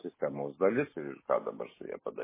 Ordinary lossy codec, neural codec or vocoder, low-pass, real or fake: MP3, 16 kbps; none; 3.6 kHz; real